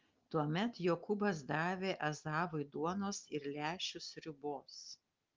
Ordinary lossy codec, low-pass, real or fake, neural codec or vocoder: Opus, 32 kbps; 7.2 kHz; real; none